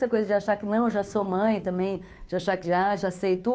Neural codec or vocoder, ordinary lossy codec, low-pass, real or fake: codec, 16 kHz, 2 kbps, FunCodec, trained on Chinese and English, 25 frames a second; none; none; fake